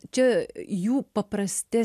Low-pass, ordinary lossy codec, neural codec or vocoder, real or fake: 14.4 kHz; AAC, 96 kbps; none; real